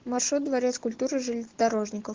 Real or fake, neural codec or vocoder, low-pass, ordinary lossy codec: real; none; 7.2 kHz; Opus, 16 kbps